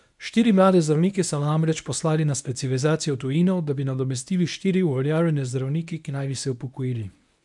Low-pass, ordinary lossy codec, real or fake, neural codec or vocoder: 10.8 kHz; none; fake; codec, 24 kHz, 0.9 kbps, WavTokenizer, medium speech release version 1